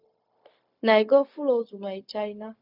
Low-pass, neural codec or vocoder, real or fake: 5.4 kHz; codec, 16 kHz, 0.4 kbps, LongCat-Audio-Codec; fake